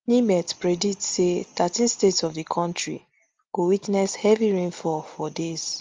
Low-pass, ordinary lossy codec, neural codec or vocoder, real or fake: 7.2 kHz; Opus, 32 kbps; none; real